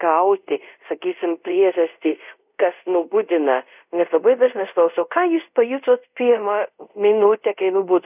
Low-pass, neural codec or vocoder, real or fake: 3.6 kHz; codec, 24 kHz, 0.5 kbps, DualCodec; fake